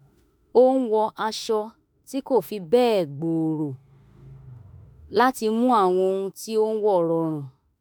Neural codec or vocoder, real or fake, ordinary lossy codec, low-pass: autoencoder, 48 kHz, 32 numbers a frame, DAC-VAE, trained on Japanese speech; fake; none; none